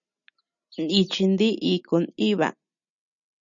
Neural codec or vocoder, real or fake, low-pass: none; real; 7.2 kHz